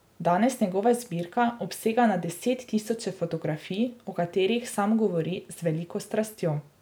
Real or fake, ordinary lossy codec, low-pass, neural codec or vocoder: real; none; none; none